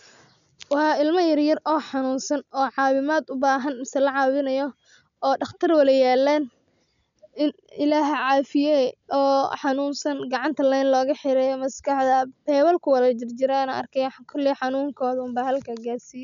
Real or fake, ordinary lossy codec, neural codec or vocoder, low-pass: real; none; none; 7.2 kHz